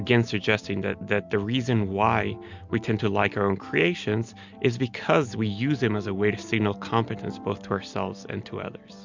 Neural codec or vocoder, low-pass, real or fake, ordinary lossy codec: none; 7.2 kHz; real; MP3, 64 kbps